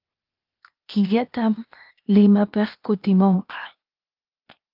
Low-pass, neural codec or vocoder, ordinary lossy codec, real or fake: 5.4 kHz; codec, 16 kHz, 0.8 kbps, ZipCodec; Opus, 24 kbps; fake